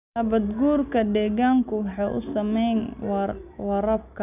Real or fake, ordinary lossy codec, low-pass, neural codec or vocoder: real; none; 3.6 kHz; none